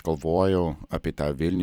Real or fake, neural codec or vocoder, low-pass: fake; vocoder, 44.1 kHz, 128 mel bands every 256 samples, BigVGAN v2; 19.8 kHz